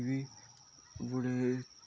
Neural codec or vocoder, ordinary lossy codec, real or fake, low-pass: none; none; real; none